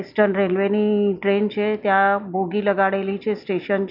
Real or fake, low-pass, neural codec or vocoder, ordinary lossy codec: real; 5.4 kHz; none; none